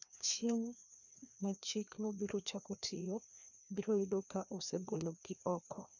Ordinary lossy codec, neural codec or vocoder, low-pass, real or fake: none; codec, 16 kHz, 2 kbps, FreqCodec, larger model; 7.2 kHz; fake